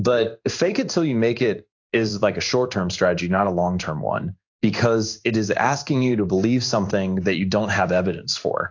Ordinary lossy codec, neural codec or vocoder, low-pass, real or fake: AAC, 48 kbps; codec, 16 kHz in and 24 kHz out, 1 kbps, XY-Tokenizer; 7.2 kHz; fake